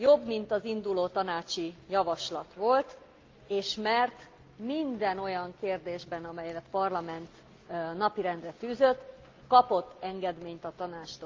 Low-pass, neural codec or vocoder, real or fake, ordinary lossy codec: 7.2 kHz; none; real; Opus, 24 kbps